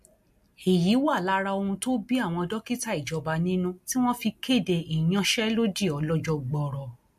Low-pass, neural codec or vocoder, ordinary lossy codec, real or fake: 14.4 kHz; none; MP3, 64 kbps; real